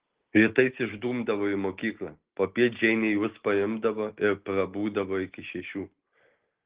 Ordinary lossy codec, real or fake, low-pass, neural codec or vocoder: Opus, 16 kbps; real; 3.6 kHz; none